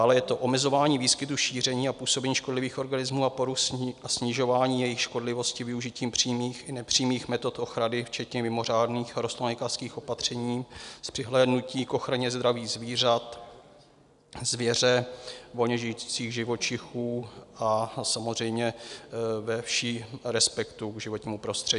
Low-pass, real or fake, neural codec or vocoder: 10.8 kHz; real; none